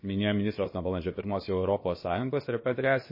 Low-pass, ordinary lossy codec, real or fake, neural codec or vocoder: 5.4 kHz; MP3, 24 kbps; fake; codec, 16 kHz, about 1 kbps, DyCAST, with the encoder's durations